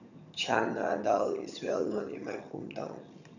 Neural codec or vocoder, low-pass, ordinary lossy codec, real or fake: vocoder, 22.05 kHz, 80 mel bands, HiFi-GAN; 7.2 kHz; none; fake